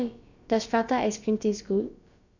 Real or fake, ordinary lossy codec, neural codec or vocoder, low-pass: fake; none; codec, 16 kHz, about 1 kbps, DyCAST, with the encoder's durations; 7.2 kHz